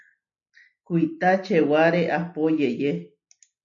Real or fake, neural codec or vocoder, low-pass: real; none; 7.2 kHz